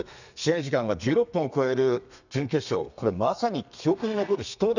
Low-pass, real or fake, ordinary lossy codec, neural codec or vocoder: 7.2 kHz; fake; none; codec, 32 kHz, 1.9 kbps, SNAC